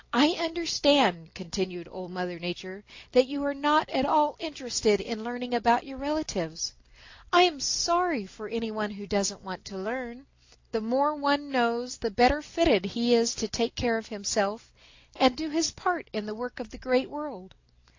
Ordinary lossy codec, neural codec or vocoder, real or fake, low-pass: AAC, 48 kbps; none; real; 7.2 kHz